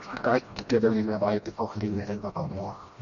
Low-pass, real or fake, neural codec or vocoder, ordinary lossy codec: 7.2 kHz; fake; codec, 16 kHz, 1 kbps, FreqCodec, smaller model; MP3, 48 kbps